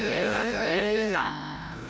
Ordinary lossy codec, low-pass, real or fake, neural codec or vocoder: none; none; fake; codec, 16 kHz, 0.5 kbps, FreqCodec, larger model